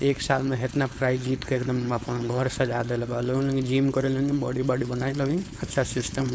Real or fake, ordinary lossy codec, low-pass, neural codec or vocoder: fake; none; none; codec, 16 kHz, 4.8 kbps, FACodec